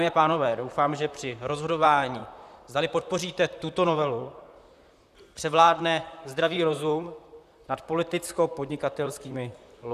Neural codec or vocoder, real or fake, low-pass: vocoder, 44.1 kHz, 128 mel bands, Pupu-Vocoder; fake; 14.4 kHz